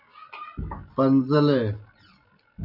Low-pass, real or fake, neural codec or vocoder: 5.4 kHz; real; none